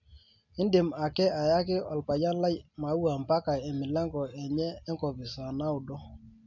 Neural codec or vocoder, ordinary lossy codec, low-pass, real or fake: none; none; 7.2 kHz; real